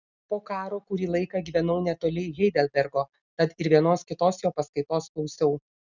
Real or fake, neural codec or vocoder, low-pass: real; none; 7.2 kHz